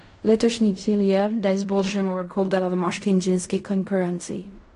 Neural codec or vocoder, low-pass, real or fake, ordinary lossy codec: codec, 16 kHz in and 24 kHz out, 0.4 kbps, LongCat-Audio-Codec, fine tuned four codebook decoder; 10.8 kHz; fake; AAC, 48 kbps